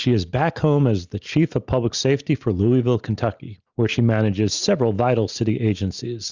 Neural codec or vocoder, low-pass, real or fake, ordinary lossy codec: none; 7.2 kHz; real; Opus, 64 kbps